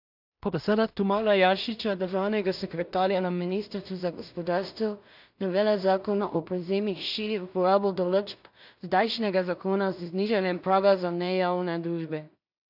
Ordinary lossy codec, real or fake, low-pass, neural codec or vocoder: AAC, 48 kbps; fake; 5.4 kHz; codec, 16 kHz in and 24 kHz out, 0.4 kbps, LongCat-Audio-Codec, two codebook decoder